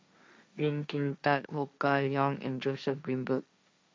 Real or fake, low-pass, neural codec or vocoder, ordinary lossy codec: fake; none; codec, 16 kHz, 1.1 kbps, Voila-Tokenizer; none